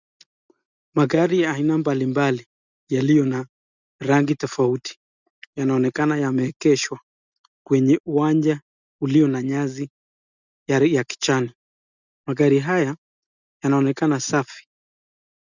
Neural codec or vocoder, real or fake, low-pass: none; real; 7.2 kHz